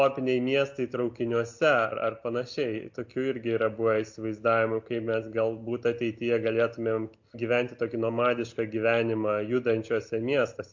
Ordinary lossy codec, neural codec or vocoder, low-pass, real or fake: MP3, 48 kbps; none; 7.2 kHz; real